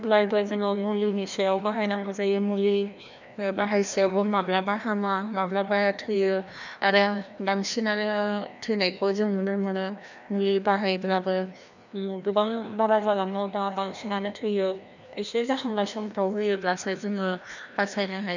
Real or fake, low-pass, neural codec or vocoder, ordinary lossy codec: fake; 7.2 kHz; codec, 16 kHz, 1 kbps, FreqCodec, larger model; none